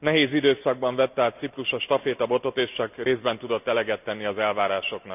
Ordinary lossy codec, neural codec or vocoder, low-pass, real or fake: none; none; 3.6 kHz; real